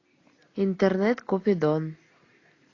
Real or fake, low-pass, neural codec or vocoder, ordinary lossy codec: real; 7.2 kHz; none; AAC, 32 kbps